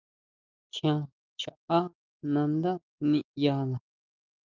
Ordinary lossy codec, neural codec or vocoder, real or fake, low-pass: Opus, 24 kbps; none; real; 7.2 kHz